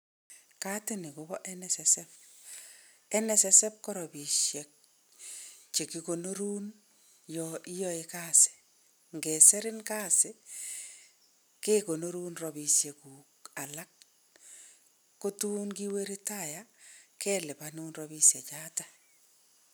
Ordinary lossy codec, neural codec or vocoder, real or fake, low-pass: none; none; real; none